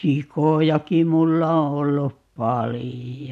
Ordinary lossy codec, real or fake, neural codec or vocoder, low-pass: none; real; none; 14.4 kHz